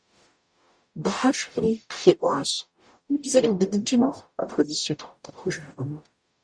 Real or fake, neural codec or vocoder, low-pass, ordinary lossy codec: fake; codec, 44.1 kHz, 0.9 kbps, DAC; 9.9 kHz; Opus, 64 kbps